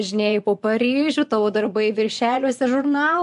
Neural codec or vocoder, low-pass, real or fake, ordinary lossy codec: vocoder, 24 kHz, 100 mel bands, Vocos; 10.8 kHz; fake; MP3, 64 kbps